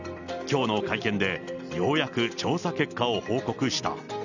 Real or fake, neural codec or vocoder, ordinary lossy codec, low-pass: real; none; none; 7.2 kHz